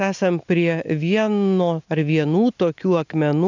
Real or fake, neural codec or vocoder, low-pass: real; none; 7.2 kHz